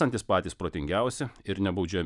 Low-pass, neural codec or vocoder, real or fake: 10.8 kHz; none; real